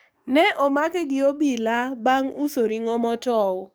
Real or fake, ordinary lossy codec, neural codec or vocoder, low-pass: fake; none; codec, 44.1 kHz, 7.8 kbps, DAC; none